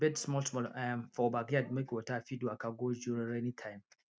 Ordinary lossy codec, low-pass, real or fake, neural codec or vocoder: none; none; real; none